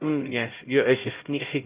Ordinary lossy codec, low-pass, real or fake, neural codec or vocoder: Opus, 32 kbps; 3.6 kHz; fake; codec, 16 kHz, 0.5 kbps, X-Codec, HuBERT features, trained on LibriSpeech